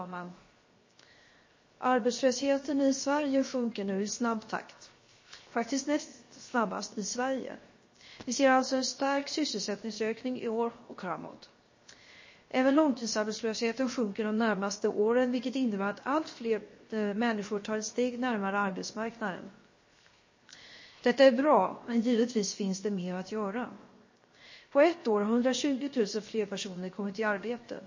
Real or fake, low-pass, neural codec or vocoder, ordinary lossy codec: fake; 7.2 kHz; codec, 16 kHz, 0.7 kbps, FocalCodec; MP3, 32 kbps